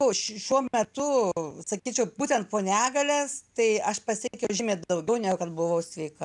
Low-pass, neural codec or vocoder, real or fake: 10.8 kHz; none; real